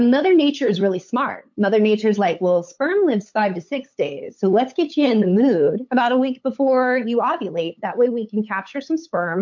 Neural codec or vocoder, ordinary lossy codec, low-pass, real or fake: codec, 16 kHz, 8 kbps, FunCodec, trained on LibriTTS, 25 frames a second; MP3, 48 kbps; 7.2 kHz; fake